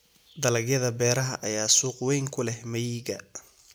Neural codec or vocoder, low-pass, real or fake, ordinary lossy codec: none; none; real; none